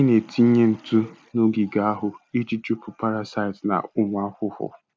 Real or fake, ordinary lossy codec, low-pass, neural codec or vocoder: real; none; none; none